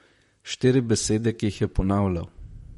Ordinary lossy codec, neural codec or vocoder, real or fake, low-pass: MP3, 48 kbps; vocoder, 44.1 kHz, 128 mel bands, Pupu-Vocoder; fake; 19.8 kHz